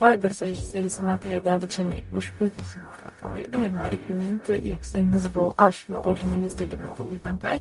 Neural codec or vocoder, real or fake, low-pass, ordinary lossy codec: codec, 44.1 kHz, 0.9 kbps, DAC; fake; 14.4 kHz; MP3, 48 kbps